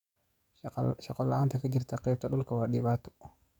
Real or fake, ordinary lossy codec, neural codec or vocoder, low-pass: fake; none; codec, 44.1 kHz, 7.8 kbps, DAC; 19.8 kHz